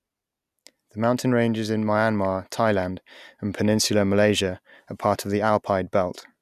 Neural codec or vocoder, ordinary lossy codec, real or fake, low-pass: none; none; real; 14.4 kHz